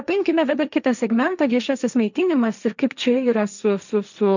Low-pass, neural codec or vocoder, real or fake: 7.2 kHz; codec, 16 kHz, 1.1 kbps, Voila-Tokenizer; fake